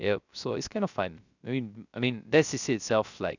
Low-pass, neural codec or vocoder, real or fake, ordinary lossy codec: 7.2 kHz; codec, 16 kHz, 0.3 kbps, FocalCodec; fake; none